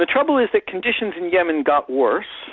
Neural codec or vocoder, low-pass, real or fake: none; 7.2 kHz; real